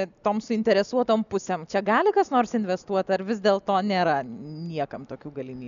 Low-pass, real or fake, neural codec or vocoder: 7.2 kHz; real; none